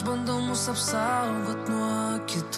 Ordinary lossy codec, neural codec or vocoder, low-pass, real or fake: MP3, 64 kbps; none; 14.4 kHz; real